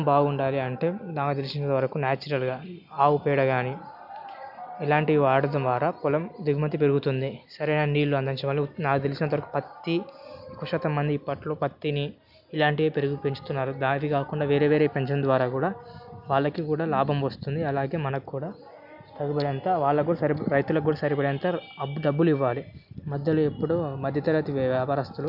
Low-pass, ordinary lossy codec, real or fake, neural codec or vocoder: 5.4 kHz; none; real; none